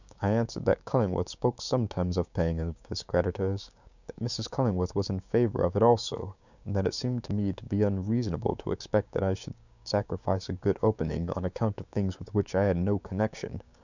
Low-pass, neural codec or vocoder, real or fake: 7.2 kHz; codec, 16 kHz, 6 kbps, DAC; fake